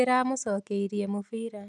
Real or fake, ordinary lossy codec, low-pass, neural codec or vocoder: real; none; none; none